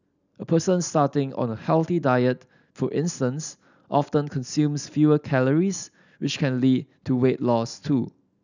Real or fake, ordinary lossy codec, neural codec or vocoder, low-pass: real; none; none; 7.2 kHz